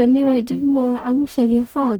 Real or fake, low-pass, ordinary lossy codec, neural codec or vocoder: fake; none; none; codec, 44.1 kHz, 0.9 kbps, DAC